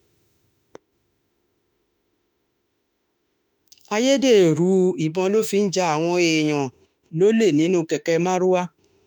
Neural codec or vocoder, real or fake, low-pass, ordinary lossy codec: autoencoder, 48 kHz, 32 numbers a frame, DAC-VAE, trained on Japanese speech; fake; 19.8 kHz; none